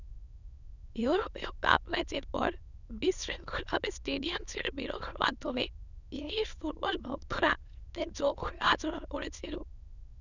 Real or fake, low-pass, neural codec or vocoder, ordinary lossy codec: fake; 7.2 kHz; autoencoder, 22.05 kHz, a latent of 192 numbers a frame, VITS, trained on many speakers; none